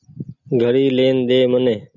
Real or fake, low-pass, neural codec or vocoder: real; 7.2 kHz; none